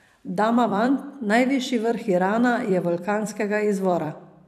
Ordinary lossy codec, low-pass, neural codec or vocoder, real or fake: none; 14.4 kHz; vocoder, 44.1 kHz, 128 mel bands every 256 samples, BigVGAN v2; fake